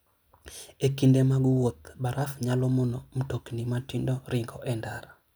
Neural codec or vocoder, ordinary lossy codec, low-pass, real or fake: none; none; none; real